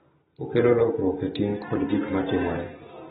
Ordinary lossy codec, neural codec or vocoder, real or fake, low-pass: AAC, 16 kbps; none; real; 7.2 kHz